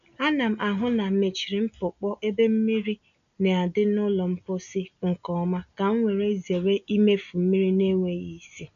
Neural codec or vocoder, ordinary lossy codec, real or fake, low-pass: none; none; real; 7.2 kHz